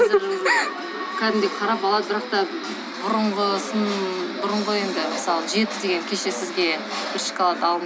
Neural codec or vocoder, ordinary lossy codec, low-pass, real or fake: none; none; none; real